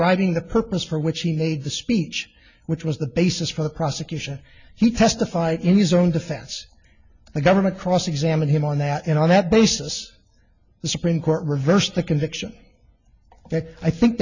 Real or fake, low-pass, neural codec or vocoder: real; 7.2 kHz; none